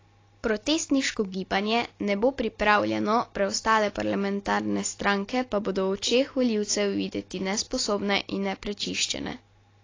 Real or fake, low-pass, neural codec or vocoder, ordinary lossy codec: real; 7.2 kHz; none; AAC, 32 kbps